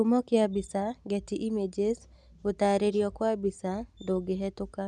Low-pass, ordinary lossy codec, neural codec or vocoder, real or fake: none; none; none; real